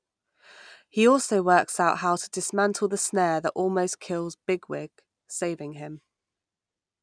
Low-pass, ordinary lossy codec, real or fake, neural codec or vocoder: 9.9 kHz; none; real; none